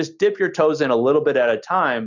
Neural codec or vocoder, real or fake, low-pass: none; real; 7.2 kHz